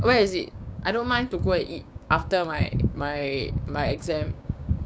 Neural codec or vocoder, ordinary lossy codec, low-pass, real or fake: codec, 16 kHz, 6 kbps, DAC; none; none; fake